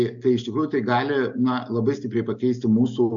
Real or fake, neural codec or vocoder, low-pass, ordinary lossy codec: real; none; 7.2 kHz; AAC, 64 kbps